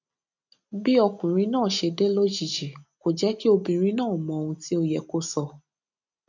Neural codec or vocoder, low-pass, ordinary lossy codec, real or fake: none; 7.2 kHz; none; real